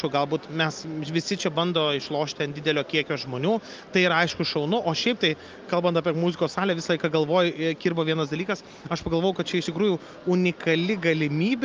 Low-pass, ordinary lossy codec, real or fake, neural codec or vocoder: 7.2 kHz; Opus, 24 kbps; real; none